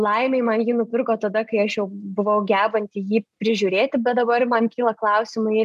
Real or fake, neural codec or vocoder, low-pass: real; none; 14.4 kHz